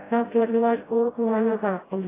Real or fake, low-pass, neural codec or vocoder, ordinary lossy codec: fake; 3.6 kHz; codec, 16 kHz, 0.5 kbps, FreqCodec, smaller model; AAC, 16 kbps